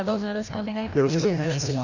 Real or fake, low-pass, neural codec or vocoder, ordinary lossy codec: fake; 7.2 kHz; codec, 16 kHz, 1 kbps, FreqCodec, larger model; none